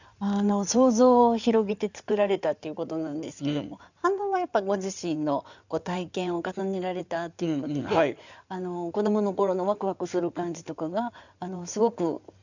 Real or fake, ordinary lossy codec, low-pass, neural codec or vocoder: fake; none; 7.2 kHz; codec, 16 kHz in and 24 kHz out, 2.2 kbps, FireRedTTS-2 codec